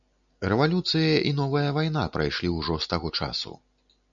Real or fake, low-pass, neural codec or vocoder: real; 7.2 kHz; none